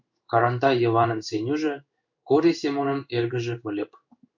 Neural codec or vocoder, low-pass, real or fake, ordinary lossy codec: codec, 16 kHz in and 24 kHz out, 1 kbps, XY-Tokenizer; 7.2 kHz; fake; MP3, 64 kbps